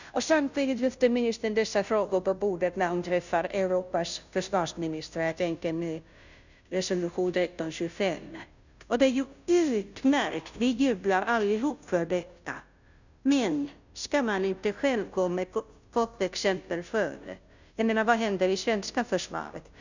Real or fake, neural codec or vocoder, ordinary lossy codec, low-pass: fake; codec, 16 kHz, 0.5 kbps, FunCodec, trained on Chinese and English, 25 frames a second; none; 7.2 kHz